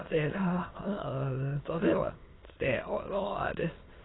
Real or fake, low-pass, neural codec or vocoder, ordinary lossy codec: fake; 7.2 kHz; autoencoder, 22.05 kHz, a latent of 192 numbers a frame, VITS, trained on many speakers; AAC, 16 kbps